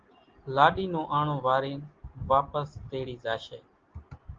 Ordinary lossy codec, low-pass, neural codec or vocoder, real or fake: Opus, 16 kbps; 7.2 kHz; none; real